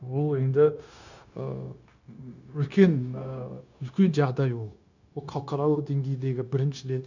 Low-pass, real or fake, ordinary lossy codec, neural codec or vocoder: 7.2 kHz; fake; none; codec, 16 kHz, 0.9 kbps, LongCat-Audio-Codec